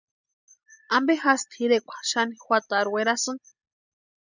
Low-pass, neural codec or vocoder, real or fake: 7.2 kHz; none; real